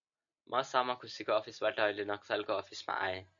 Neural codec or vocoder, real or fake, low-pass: none; real; 7.2 kHz